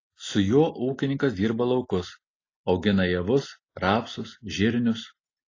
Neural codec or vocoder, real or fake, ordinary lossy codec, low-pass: none; real; AAC, 32 kbps; 7.2 kHz